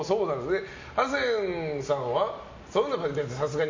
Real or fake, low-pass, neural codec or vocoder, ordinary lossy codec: real; 7.2 kHz; none; MP3, 48 kbps